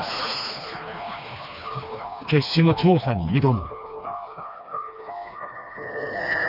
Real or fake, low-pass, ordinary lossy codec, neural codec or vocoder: fake; 5.4 kHz; none; codec, 16 kHz, 2 kbps, FreqCodec, smaller model